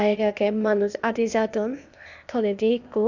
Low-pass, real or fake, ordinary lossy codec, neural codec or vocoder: 7.2 kHz; fake; none; codec, 16 kHz, 0.8 kbps, ZipCodec